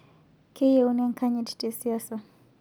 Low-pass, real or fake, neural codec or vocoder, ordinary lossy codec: none; real; none; none